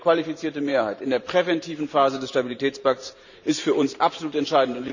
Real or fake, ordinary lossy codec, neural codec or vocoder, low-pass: fake; none; vocoder, 44.1 kHz, 128 mel bands every 512 samples, BigVGAN v2; 7.2 kHz